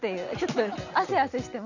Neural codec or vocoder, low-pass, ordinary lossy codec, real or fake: none; 7.2 kHz; none; real